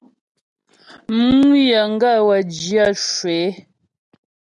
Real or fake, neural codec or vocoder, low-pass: real; none; 10.8 kHz